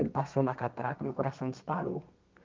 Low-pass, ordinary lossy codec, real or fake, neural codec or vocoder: 7.2 kHz; Opus, 32 kbps; fake; codec, 32 kHz, 1.9 kbps, SNAC